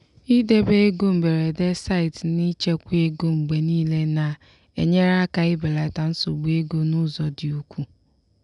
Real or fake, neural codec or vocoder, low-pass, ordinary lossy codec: real; none; 10.8 kHz; none